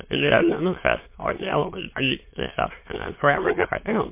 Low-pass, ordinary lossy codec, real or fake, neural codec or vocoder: 3.6 kHz; MP3, 24 kbps; fake; autoencoder, 22.05 kHz, a latent of 192 numbers a frame, VITS, trained on many speakers